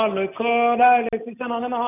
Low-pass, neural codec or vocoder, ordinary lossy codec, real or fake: 3.6 kHz; none; none; real